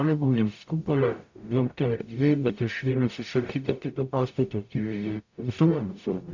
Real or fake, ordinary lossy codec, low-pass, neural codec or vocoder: fake; MP3, 64 kbps; 7.2 kHz; codec, 44.1 kHz, 0.9 kbps, DAC